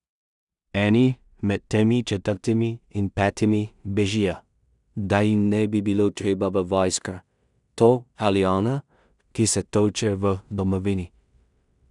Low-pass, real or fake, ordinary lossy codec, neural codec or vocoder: 10.8 kHz; fake; none; codec, 16 kHz in and 24 kHz out, 0.4 kbps, LongCat-Audio-Codec, two codebook decoder